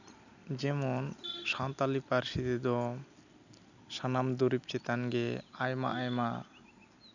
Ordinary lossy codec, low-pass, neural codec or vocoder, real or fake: none; 7.2 kHz; none; real